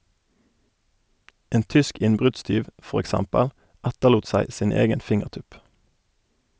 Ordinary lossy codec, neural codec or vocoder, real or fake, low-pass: none; none; real; none